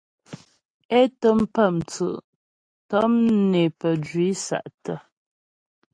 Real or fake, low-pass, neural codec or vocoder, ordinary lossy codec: real; 9.9 kHz; none; AAC, 48 kbps